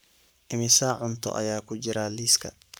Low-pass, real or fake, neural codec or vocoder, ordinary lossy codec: none; fake; codec, 44.1 kHz, 7.8 kbps, Pupu-Codec; none